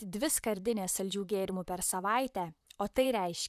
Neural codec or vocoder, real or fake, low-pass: vocoder, 44.1 kHz, 128 mel bands, Pupu-Vocoder; fake; 14.4 kHz